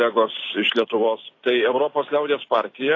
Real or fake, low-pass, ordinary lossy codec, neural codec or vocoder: fake; 7.2 kHz; AAC, 32 kbps; vocoder, 44.1 kHz, 128 mel bands every 256 samples, BigVGAN v2